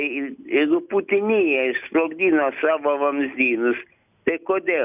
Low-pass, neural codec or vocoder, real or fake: 3.6 kHz; none; real